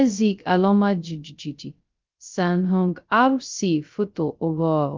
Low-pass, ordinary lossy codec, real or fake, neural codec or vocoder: 7.2 kHz; Opus, 32 kbps; fake; codec, 16 kHz, 0.2 kbps, FocalCodec